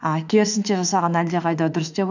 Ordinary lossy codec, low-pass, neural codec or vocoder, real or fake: none; 7.2 kHz; autoencoder, 48 kHz, 32 numbers a frame, DAC-VAE, trained on Japanese speech; fake